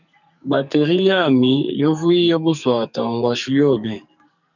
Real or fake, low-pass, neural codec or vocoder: fake; 7.2 kHz; codec, 44.1 kHz, 2.6 kbps, SNAC